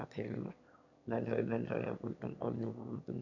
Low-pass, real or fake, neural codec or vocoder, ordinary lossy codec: 7.2 kHz; fake; autoencoder, 22.05 kHz, a latent of 192 numbers a frame, VITS, trained on one speaker; none